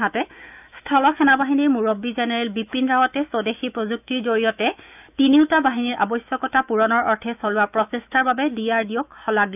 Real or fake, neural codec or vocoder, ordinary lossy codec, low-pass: fake; autoencoder, 48 kHz, 128 numbers a frame, DAC-VAE, trained on Japanese speech; none; 3.6 kHz